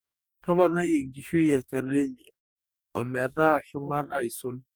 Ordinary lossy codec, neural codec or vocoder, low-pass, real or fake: none; codec, 44.1 kHz, 2.6 kbps, DAC; none; fake